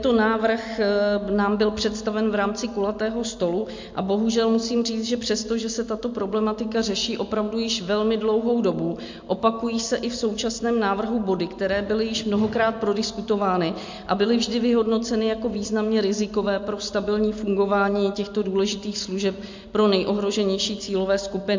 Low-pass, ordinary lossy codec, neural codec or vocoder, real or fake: 7.2 kHz; MP3, 48 kbps; none; real